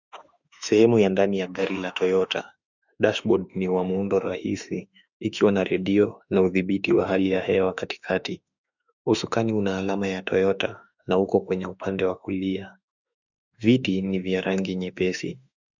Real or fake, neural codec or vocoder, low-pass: fake; autoencoder, 48 kHz, 32 numbers a frame, DAC-VAE, trained on Japanese speech; 7.2 kHz